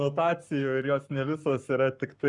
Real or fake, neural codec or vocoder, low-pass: fake; codec, 44.1 kHz, 7.8 kbps, Pupu-Codec; 10.8 kHz